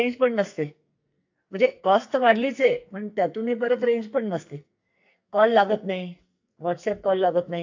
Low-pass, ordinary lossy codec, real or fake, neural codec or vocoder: 7.2 kHz; AAC, 48 kbps; fake; codec, 44.1 kHz, 2.6 kbps, SNAC